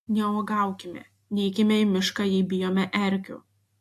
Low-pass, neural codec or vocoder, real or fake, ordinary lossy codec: 14.4 kHz; none; real; AAC, 64 kbps